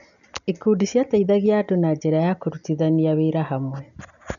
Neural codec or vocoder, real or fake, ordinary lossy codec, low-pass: none; real; none; 7.2 kHz